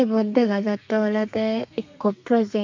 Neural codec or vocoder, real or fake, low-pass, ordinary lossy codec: codec, 32 kHz, 1.9 kbps, SNAC; fake; 7.2 kHz; MP3, 64 kbps